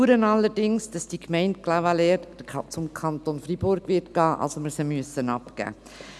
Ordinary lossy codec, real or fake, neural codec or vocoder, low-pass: none; real; none; none